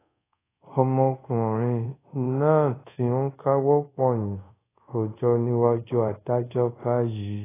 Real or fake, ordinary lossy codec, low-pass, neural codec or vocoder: fake; AAC, 16 kbps; 3.6 kHz; codec, 24 kHz, 0.5 kbps, DualCodec